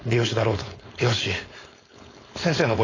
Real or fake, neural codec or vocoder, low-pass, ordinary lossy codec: fake; codec, 16 kHz, 4.8 kbps, FACodec; 7.2 kHz; AAC, 32 kbps